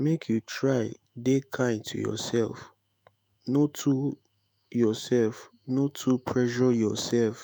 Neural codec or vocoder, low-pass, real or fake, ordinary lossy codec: autoencoder, 48 kHz, 128 numbers a frame, DAC-VAE, trained on Japanese speech; none; fake; none